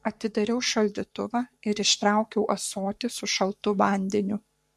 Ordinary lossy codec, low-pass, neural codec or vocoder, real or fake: MP3, 64 kbps; 14.4 kHz; codec, 44.1 kHz, 7.8 kbps, Pupu-Codec; fake